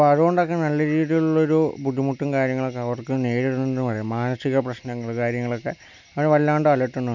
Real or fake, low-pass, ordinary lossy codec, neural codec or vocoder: real; 7.2 kHz; none; none